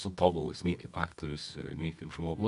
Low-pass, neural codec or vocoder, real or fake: 10.8 kHz; codec, 24 kHz, 0.9 kbps, WavTokenizer, medium music audio release; fake